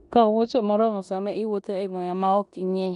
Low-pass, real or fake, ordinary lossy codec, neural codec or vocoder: 10.8 kHz; fake; none; codec, 16 kHz in and 24 kHz out, 0.9 kbps, LongCat-Audio-Codec, four codebook decoder